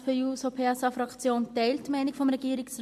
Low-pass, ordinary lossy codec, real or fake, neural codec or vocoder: 14.4 kHz; MP3, 64 kbps; real; none